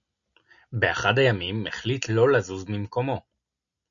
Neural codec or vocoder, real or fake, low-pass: none; real; 7.2 kHz